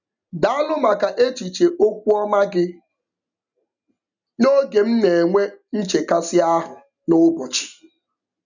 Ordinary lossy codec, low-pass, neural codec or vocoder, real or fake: none; 7.2 kHz; none; real